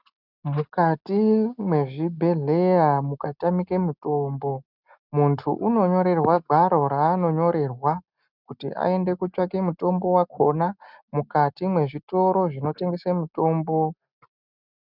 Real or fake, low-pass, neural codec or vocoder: real; 5.4 kHz; none